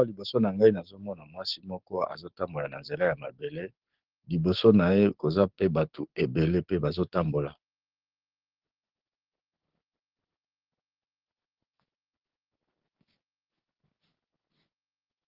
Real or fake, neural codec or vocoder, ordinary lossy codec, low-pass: fake; codec, 16 kHz, 6 kbps, DAC; Opus, 16 kbps; 5.4 kHz